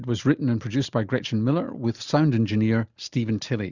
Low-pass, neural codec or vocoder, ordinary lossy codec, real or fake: 7.2 kHz; none; Opus, 64 kbps; real